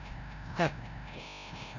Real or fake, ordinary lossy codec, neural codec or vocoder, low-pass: fake; none; codec, 16 kHz, 0.5 kbps, FreqCodec, larger model; 7.2 kHz